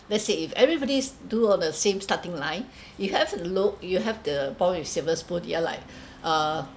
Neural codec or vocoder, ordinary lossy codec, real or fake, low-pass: none; none; real; none